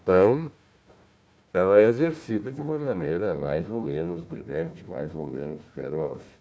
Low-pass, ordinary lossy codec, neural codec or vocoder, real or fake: none; none; codec, 16 kHz, 1 kbps, FunCodec, trained on Chinese and English, 50 frames a second; fake